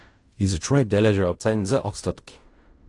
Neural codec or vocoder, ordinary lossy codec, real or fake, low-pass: codec, 16 kHz in and 24 kHz out, 0.4 kbps, LongCat-Audio-Codec, fine tuned four codebook decoder; AAC, 48 kbps; fake; 10.8 kHz